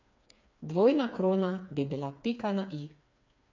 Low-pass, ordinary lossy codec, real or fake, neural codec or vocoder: 7.2 kHz; AAC, 48 kbps; fake; codec, 16 kHz, 4 kbps, FreqCodec, smaller model